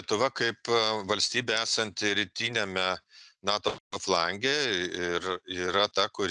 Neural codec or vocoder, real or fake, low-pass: none; real; 10.8 kHz